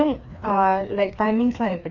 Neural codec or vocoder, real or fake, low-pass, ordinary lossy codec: codec, 16 kHz, 2 kbps, FreqCodec, larger model; fake; 7.2 kHz; none